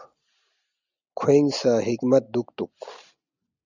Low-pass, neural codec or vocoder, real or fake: 7.2 kHz; none; real